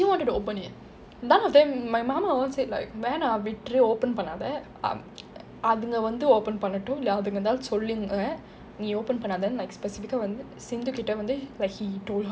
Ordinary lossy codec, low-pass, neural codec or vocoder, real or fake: none; none; none; real